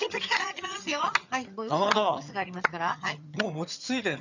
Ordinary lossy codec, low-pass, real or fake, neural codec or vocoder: none; 7.2 kHz; fake; vocoder, 22.05 kHz, 80 mel bands, HiFi-GAN